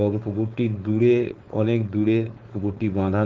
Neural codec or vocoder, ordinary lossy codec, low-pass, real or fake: codec, 16 kHz, 4.8 kbps, FACodec; Opus, 16 kbps; 7.2 kHz; fake